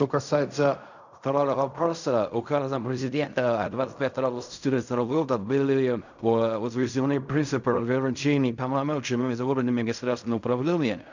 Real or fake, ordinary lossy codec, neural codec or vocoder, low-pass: fake; none; codec, 16 kHz in and 24 kHz out, 0.4 kbps, LongCat-Audio-Codec, fine tuned four codebook decoder; 7.2 kHz